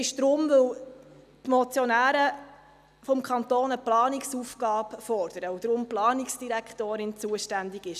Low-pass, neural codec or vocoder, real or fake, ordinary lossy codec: 14.4 kHz; none; real; none